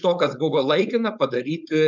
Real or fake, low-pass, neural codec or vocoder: fake; 7.2 kHz; codec, 16 kHz, 4.8 kbps, FACodec